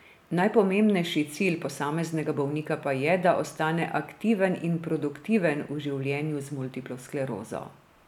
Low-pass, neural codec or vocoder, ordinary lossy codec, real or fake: 19.8 kHz; none; none; real